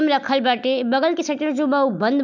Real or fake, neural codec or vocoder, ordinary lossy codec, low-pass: real; none; none; 7.2 kHz